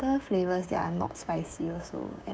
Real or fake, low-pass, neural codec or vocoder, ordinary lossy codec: fake; none; codec, 16 kHz, 6 kbps, DAC; none